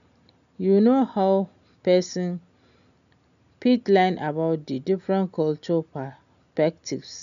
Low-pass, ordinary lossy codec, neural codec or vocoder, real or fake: 7.2 kHz; none; none; real